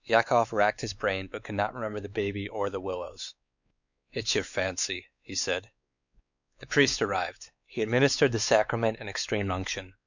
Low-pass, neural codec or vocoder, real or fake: 7.2 kHz; codec, 16 kHz, 4 kbps, X-Codec, WavLM features, trained on Multilingual LibriSpeech; fake